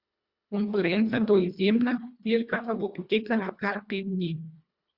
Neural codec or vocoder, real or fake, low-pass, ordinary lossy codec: codec, 24 kHz, 1.5 kbps, HILCodec; fake; 5.4 kHz; Opus, 64 kbps